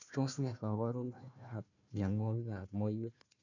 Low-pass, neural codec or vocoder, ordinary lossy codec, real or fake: 7.2 kHz; codec, 16 kHz, 1 kbps, FunCodec, trained on Chinese and English, 50 frames a second; none; fake